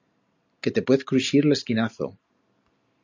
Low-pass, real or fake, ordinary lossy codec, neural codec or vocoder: 7.2 kHz; real; AAC, 48 kbps; none